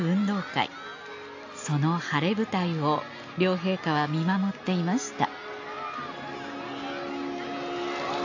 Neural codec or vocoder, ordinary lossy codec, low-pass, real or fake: none; AAC, 48 kbps; 7.2 kHz; real